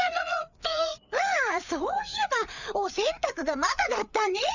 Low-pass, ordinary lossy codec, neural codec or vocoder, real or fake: 7.2 kHz; none; codec, 16 kHz, 4 kbps, FreqCodec, larger model; fake